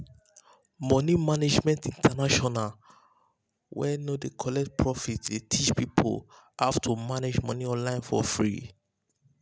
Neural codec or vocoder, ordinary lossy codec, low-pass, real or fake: none; none; none; real